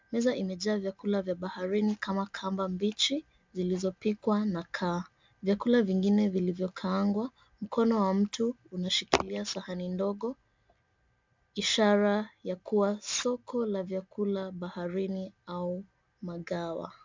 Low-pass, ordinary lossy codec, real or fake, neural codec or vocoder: 7.2 kHz; MP3, 64 kbps; real; none